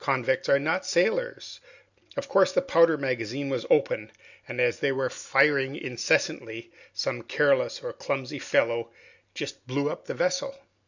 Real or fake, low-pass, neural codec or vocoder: real; 7.2 kHz; none